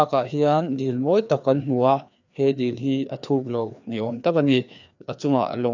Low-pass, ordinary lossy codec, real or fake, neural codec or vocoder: 7.2 kHz; none; fake; codec, 16 kHz, 2 kbps, FreqCodec, larger model